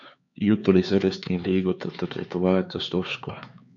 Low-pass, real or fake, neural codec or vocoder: 7.2 kHz; fake; codec, 16 kHz, 4 kbps, X-Codec, HuBERT features, trained on LibriSpeech